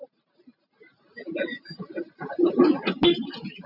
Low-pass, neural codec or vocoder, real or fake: 5.4 kHz; none; real